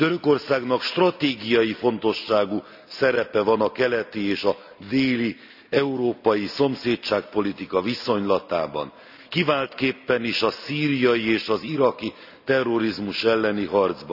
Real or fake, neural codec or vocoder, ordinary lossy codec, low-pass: real; none; none; 5.4 kHz